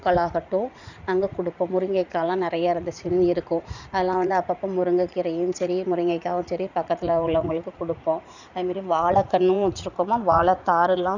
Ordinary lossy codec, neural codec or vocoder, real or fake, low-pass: none; vocoder, 44.1 kHz, 80 mel bands, Vocos; fake; 7.2 kHz